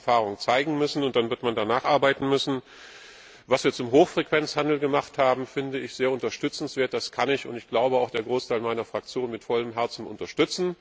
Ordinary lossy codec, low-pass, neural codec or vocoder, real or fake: none; none; none; real